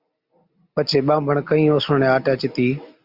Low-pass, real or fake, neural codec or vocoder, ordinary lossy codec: 5.4 kHz; real; none; Opus, 64 kbps